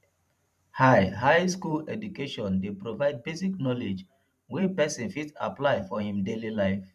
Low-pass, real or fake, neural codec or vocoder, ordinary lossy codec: 14.4 kHz; fake; vocoder, 44.1 kHz, 128 mel bands every 512 samples, BigVGAN v2; none